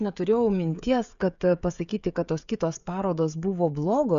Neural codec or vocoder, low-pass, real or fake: codec, 16 kHz, 16 kbps, FreqCodec, smaller model; 7.2 kHz; fake